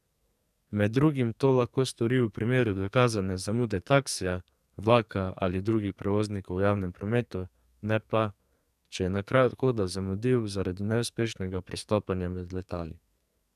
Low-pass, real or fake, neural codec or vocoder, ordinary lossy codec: 14.4 kHz; fake; codec, 44.1 kHz, 2.6 kbps, SNAC; none